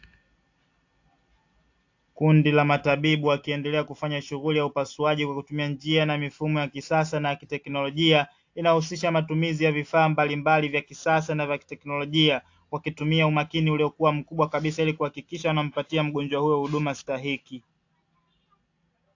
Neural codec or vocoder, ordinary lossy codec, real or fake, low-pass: none; AAC, 48 kbps; real; 7.2 kHz